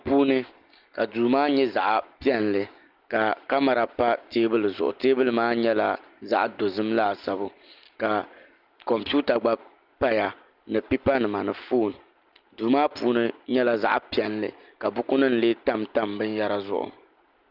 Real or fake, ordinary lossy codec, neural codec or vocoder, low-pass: real; Opus, 24 kbps; none; 5.4 kHz